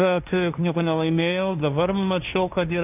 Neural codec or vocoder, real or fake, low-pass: codec, 16 kHz, 1.1 kbps, Voila-Tokenizer; fake; 3.6 kHz